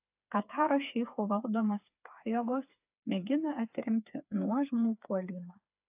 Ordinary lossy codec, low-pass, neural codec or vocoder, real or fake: AAC, 32 kbps; 3.6 kHz; codec, 16 kHz, 4 kbps, FreqCodec, smaller model; fake